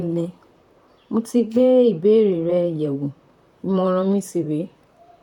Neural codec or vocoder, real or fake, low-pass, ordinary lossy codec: vocoder, 44.1 kHz, 128 mel bands, Pupu-Vocoder; fake; 19.8 kHz; none